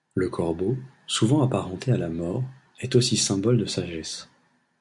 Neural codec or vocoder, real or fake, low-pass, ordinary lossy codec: none; real; 10.8 kHz; MP3, 64 kbps